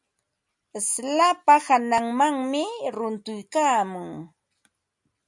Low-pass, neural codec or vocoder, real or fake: 10.8 kHz; none; real